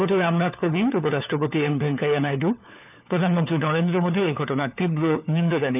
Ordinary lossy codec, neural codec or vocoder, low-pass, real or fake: none; codec, 16 kHz, 4 kbps, FunCodec, trained on LibriTTS, 50 frames a second; 3.6 kHz; fake